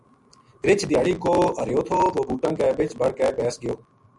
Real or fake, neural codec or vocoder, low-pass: real; none; 10.8 kHz